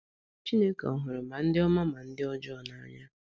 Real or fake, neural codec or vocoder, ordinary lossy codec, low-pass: real; none; none; none